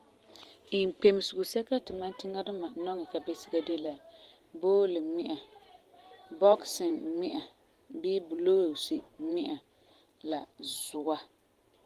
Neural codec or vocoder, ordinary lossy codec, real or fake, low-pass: vocoder, 44.1 kHz, 128 mel bands every 512 samples, BigVGAN v2; Opus, 24 kbps; fake; 14.4 kHz